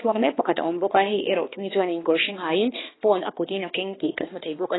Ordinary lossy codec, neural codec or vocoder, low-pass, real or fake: AAC, 16 kbps; codec, 16 kHz, 2 kbps, X-Codec, HuBERT features, trained on balanced general audio; 7.2 kHz; fake